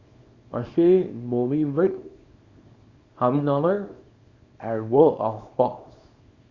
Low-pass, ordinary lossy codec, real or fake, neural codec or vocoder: 7.2 kHz; Opus, 64 kbps; fake; codec, 24 kHz, 0.9 kbps, WavTokenizer, small release